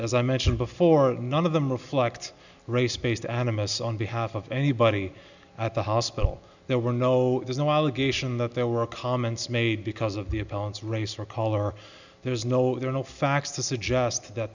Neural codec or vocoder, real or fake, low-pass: none; real; 7.2 kHz